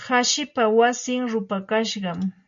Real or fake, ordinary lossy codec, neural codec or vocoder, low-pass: real; MP3, 48 kbps; none; 7.2 kHz